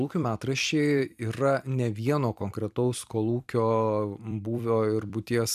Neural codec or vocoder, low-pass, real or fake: vocoder, 44.1 kHz, 128 mel bands every 256 samples, BigVGAN v2; 14.4 kHz; fake